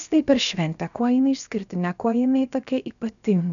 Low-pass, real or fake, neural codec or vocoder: 7.2 kHz; fake; codec, 16 kHz, 0.7 kbps, FocalCodec